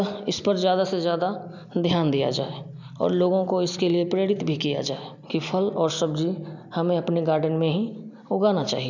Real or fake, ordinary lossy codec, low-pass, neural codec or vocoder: real; none; 7.2 kHz; none